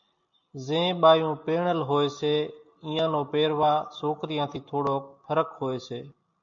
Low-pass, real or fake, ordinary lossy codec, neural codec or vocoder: 7.2 kHz; real; MP3, 48 kbps; none